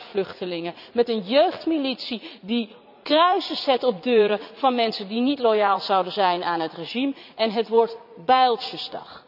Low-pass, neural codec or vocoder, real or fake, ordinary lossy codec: 5.4 kHz; vocoder, 44.1 kHz, 80 mel bands, Vocos; fake; none